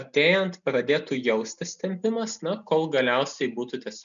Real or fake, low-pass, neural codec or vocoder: real; 7.2 kHz; none